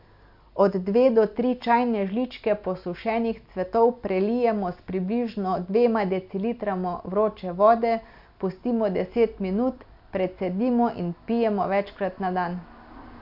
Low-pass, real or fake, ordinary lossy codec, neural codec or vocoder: 5.4 kHz; real; MP3, 48 kbps; none